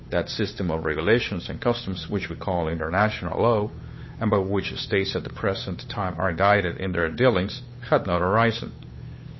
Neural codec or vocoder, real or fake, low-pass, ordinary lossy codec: codec, 16 kHz, 8 kbps, FunCodec, trained on Chinese and English, 25 frames a second; fake; 7.2 kHz; MP3, 24 kbps